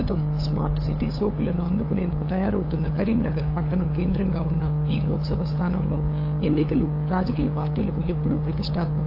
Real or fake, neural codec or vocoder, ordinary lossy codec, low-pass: fake; codec, 16 kHz, 8 kbps, FunCodec, trained on LibriTTS, 25 frames a second; AAC, 32 kbps; 5.4 kHz